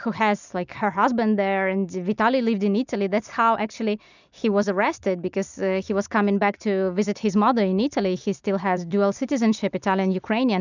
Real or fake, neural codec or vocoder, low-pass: real; none; 7.2 kHz